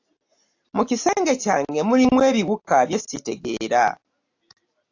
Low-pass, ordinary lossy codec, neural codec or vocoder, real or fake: 7.2 kHz; AAC, 48 kbps; none; real